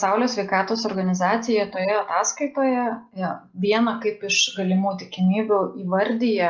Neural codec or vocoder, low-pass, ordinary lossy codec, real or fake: none; 7.2 kHz; Opus, 32 kbps; real